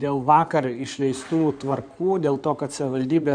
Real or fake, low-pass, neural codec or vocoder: fake; 9.9 kHz; codec, 16 kHz in and 24 kHz out, 2.2 kbps, FireRedTTS-2 codec